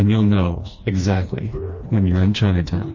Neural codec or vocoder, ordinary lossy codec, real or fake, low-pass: codec, 16 kHz, 2 kbps, FreqCodec, smaller model; MP3, 32 kbps; fake; 7.2 kHz